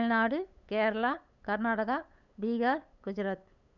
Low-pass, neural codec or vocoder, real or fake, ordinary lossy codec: 7.2 kHz; codec, 16 kHz, 8 kbps, FunCodec, trained on LibriTTS, 25 frames a second; fake; none